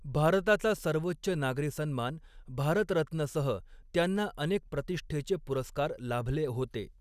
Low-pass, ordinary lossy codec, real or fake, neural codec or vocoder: 14.4 kHz; none; real; none